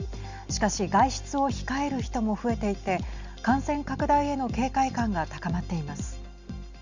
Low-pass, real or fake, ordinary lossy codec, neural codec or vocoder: 7.2 kHz; real; Opus, 64 kbps; none